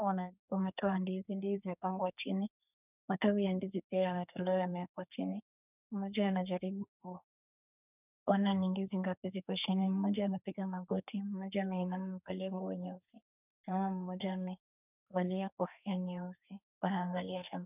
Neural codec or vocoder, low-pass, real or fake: codec, 32 kHz, 1.9 kbps, SNAC; 3.6 kHz; fake